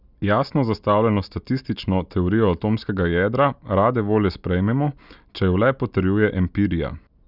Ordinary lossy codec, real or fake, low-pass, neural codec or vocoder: none; real; 5.4 kHz; none